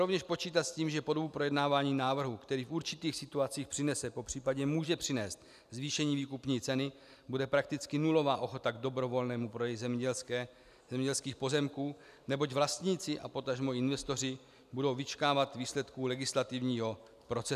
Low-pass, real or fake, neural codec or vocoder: 14.4 kHz; real; none